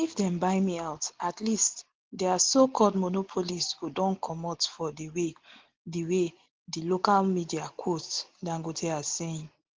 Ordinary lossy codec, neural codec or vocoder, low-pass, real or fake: Opus, 16 kbps; none; 7.2 kHz; real